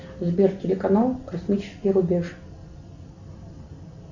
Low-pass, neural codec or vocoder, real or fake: 7.2 kHz; none; real